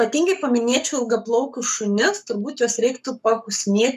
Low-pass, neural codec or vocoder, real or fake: 14.4 kHz; codec, 44.1 kHz, 7.8 kbps, Pupu-Codec; fake